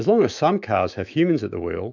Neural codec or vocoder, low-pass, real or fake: autoencoder, 48 kHz, 128 numbers a frame, DAC-VAE, trained on Japanese speech; 7.2 kHz; fake